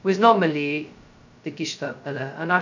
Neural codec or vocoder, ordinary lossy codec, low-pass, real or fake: codec, 16 kHz, 0.2 kbps, FocalCodec; none; 7.2 kHz; fake